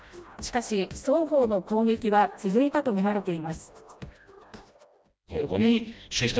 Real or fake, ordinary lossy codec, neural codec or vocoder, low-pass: fake; none; codec, 16 kHz, 1 kbps, FreqCodec, smaller model; none